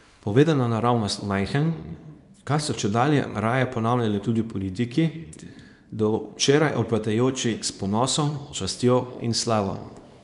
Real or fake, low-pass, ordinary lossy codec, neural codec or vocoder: fake; 10.8 kHz; none; codec, 24 kHz, 0.9 kbps, WavTokenizer, small release